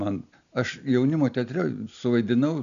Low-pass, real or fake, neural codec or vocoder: 7.2 kHz; real; none